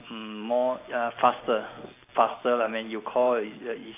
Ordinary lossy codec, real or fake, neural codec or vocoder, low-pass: AAC, 24 kbps; real; none; 3.6 kHz